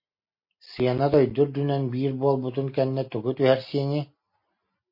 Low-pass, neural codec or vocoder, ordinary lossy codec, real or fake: 5.4 kHz; none; MP3, 32 kbps; real